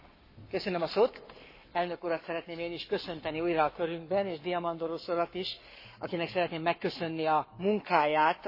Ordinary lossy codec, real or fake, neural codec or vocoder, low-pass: MP3, 24 kbps; fake; codec, 44.1 kHz, 7.8 kbps, Pupu-Codec; 5.4 kHz